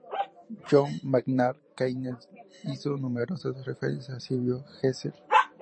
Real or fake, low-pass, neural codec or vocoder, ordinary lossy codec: fake; 9.9 kHz; vocoder, 44.1 kHz, 128 mel bands every 512 samples, BigVGAN v2; MP3, 32 kbps